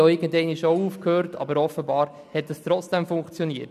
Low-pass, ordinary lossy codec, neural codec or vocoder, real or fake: 14.4 kHz; none; none; real